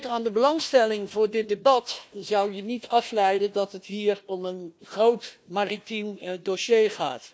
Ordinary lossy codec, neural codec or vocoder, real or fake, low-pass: none; codec, 16 kHz, 1 kbps, FunCodec, trained on Chinese and English, 50 frames a second; fake; none